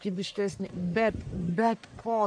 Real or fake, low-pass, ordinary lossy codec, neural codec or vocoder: fake; 9.9 kHz; AAC, 64 kbps; codec, 44.1 kHz, 1.7 kbps, Pupu-Codec